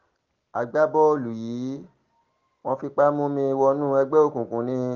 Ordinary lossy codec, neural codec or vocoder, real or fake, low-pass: Opus, 16 kbps; none; real; 7.2 kHz